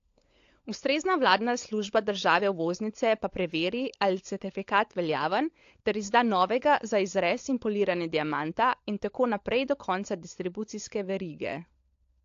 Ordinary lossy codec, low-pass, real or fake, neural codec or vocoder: AAC, 48 kbps; 7.2 kHz; fake; codec, 16 kHz, 16 kbps, FunCodec, trained on LibriTTS, 50 frames a second